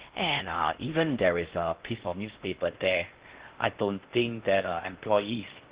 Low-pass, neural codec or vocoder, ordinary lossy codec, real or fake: 3.6 kHz; codec, 16 kHz in and 24 kHz out, 0.6 kbps, FocalCodec, streaming, 4096 codes; Opus, 16 kbps; fake